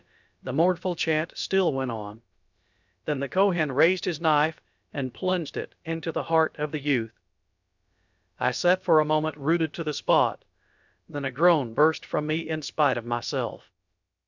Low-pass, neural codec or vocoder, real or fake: 7.2 kHz; codec, 16 kHz, about 1 kbps, DyCAST, with the encoder's durations; fake